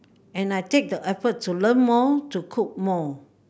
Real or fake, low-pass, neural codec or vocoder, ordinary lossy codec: real; none; none; none